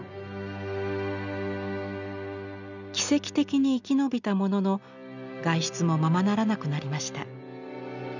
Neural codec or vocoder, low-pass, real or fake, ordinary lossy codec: none; 7.2 kHz; real; none